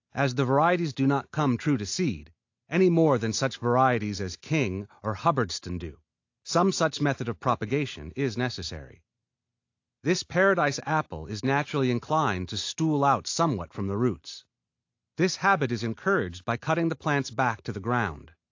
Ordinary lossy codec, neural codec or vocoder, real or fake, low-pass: AAC, 48 kbps; none; real; 7.2 kHz